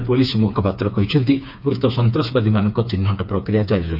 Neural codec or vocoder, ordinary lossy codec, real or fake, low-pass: codec, 16 kHz, 4 kbps, FreqCodec, smaller model; none; fake; 5.4 kHz